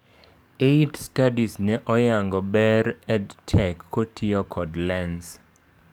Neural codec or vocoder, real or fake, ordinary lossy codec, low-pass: codec, 44.1 kHz, 7.8 kbps, DAC; fake; none; none